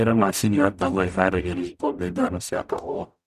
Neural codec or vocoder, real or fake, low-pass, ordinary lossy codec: codec, 44.1 kHz, 0.9 kbps, DAC; fake; 14.4 kHz; none